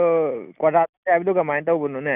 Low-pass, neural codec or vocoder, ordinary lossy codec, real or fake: 3.6 kHz; none; none; real